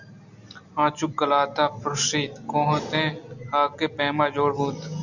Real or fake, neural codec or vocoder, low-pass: real; none; 7.2 kHz